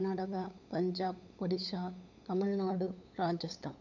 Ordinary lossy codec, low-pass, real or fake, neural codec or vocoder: none; 7.2 kHz; fake; codec, 16 kHz, 8 kbps, FunCodec, trained on LibriTTS, 25 frames a second